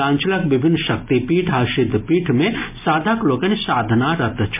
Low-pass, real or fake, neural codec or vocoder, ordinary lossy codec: 3.6 kHz; real; none; MP3, 32 kbps